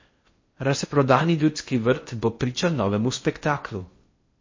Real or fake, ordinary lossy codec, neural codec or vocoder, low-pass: fake; MP3, 32 kbps; codec, 16 kHz in and 24 kHz out, 0.6 kbps, FocalCodec, streaming, 4096 codes; 7.2 kHz